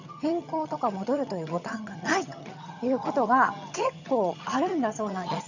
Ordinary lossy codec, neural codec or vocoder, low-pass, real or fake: none; vocoder, 22.05 kHz, 80 mel bands, HiFi-GAN; 7.2 kHz; fake